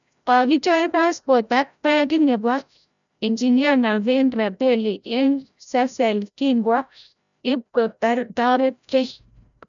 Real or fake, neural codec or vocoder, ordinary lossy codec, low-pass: fake; codec, 16 kHz, 0.5 kbps, FreqCodec, larger model; none; 7.2 kHz